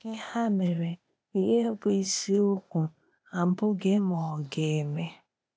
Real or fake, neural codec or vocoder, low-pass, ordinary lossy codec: fake; codec, 16 kHz, 0.8 kbps, ZipCodec; none; none